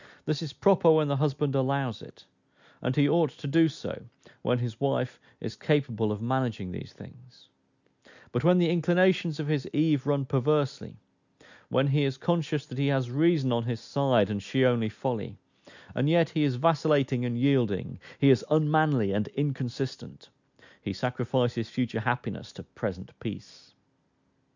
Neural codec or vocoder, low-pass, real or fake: none; 7.2 kHz; real